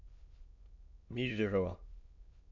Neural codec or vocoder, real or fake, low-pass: autoencoder, 22.05 kHz, a latent of 192 numbers a frame, VITS, trained on many speakers; fake; 7.2 kHz